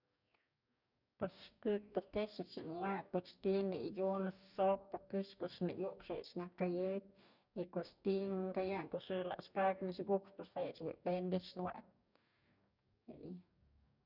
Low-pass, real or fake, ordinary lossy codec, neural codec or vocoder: 5.4 kHz; fake; none; codec, 44.1 kHz, 2.6 kbps, DAC